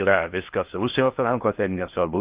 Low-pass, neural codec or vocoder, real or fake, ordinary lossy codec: 3.6 kHz; codec, 16 kHz in and 24 kHz out, 0.6 kbps, FocalCodec, streaming, 2048 codes; fake; Opus, 32 kbps